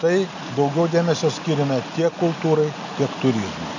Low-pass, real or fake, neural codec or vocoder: 7.2 kHz; real; none